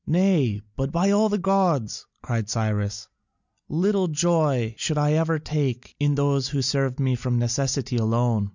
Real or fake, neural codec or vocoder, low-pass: real; none; 7.2 kHz